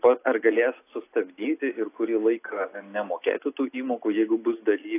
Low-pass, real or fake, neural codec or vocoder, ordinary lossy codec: 3.6 kHz; real; none; AAC, 24 kbps